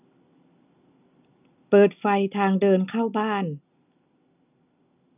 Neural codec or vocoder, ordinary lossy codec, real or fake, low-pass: none; none; real; 3.6 kHz